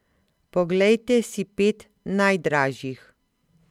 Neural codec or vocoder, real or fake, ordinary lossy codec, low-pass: none; real; MP3, 96 kbps; 19.8 kHz